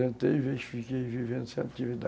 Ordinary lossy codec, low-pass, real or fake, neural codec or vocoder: none; none; real; none